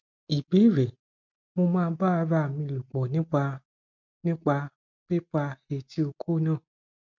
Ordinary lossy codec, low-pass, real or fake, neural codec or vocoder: MP3, 64 kbps; 7.2 kHz; real; none